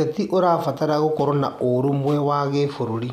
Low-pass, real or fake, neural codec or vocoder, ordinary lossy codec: 14.4 kHz; real; none; none